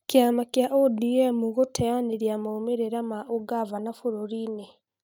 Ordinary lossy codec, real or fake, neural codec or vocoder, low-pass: none; real; none; 19.8 kHz